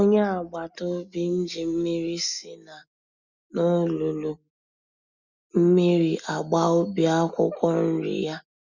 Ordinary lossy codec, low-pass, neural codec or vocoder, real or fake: Opus, 64 kbps; 7.2 kHz; none; real